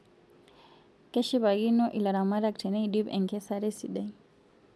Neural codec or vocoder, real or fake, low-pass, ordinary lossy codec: none; real; none; none